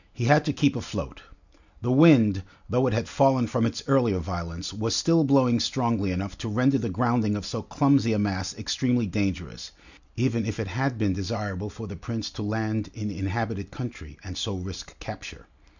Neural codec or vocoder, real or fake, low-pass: none; real; 7.2 kHz